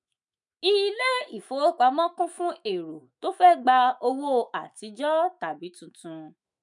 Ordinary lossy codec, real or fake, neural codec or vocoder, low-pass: none; fake; autoencoder, 48 kHz, 128 numbers a frame, DAC-VAE, trained on Japanese speech; 10.8 kHz